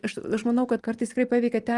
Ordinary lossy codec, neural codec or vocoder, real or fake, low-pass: Opus, 24 kbps; none; real; 10.8 kHz